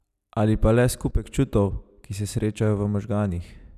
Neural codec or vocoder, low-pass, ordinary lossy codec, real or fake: none; 14.4 kHz; none; real